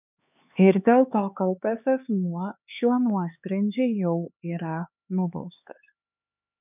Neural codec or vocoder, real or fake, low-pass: codec, 16 kHz, 4 kbps, X-Codec, HuBERT features, trained on LibriSpeech; fake; 3.6 kHz